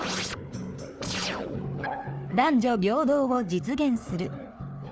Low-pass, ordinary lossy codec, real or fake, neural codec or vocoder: none; none; fake; codec, 16 kHz, 4 kbps, FunCodec, trained on Chinese and English, 50 frames a second